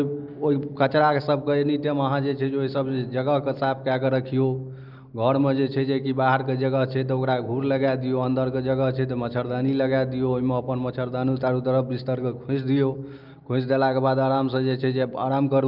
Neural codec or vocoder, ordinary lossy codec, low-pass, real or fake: none; Opus, 24 kbps; 5.4 kHz; real